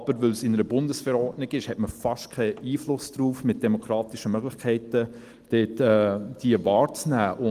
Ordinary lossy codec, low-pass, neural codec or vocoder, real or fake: Opus, 24 kbps; 14.4 kHz; none; real